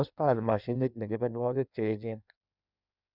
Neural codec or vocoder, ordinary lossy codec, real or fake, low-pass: codec, 16 kHz in and 24 kHz out, 1.1 kbps, FireRedTTS-2 codec; none; fake; 5.4 kHz